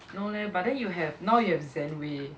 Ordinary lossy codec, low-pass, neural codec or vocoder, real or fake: none; none; none; real